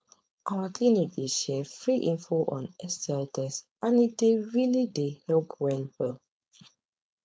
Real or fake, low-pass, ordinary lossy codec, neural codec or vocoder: fake; none; none; codec, 16 kHz, 4.8 kbps, FACodec